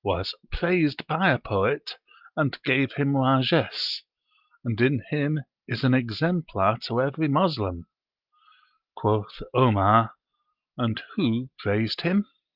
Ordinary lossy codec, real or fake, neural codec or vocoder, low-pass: Opus, 32 kbps; real; none; 5.4 kHz